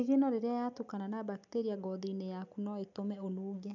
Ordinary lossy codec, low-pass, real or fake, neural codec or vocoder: none; 7.2 kHz; real; none